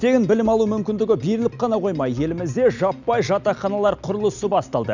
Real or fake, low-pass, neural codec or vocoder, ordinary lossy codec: real; 7.2 kHz; none; none